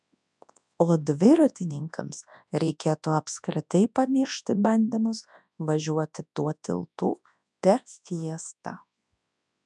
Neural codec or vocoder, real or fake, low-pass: codec, 24 kHz, 0.9 kbps, WavTokenizer, large speech release; fake; 10.8 kHz